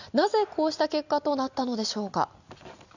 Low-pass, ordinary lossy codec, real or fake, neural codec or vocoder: 7.2 kHz; none; real; none